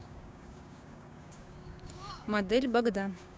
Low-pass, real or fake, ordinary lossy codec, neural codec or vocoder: none; fake; none; codec, 16 kHz, 6 kbps, DAC